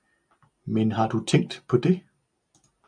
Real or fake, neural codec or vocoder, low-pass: real; none; 9.9 kHz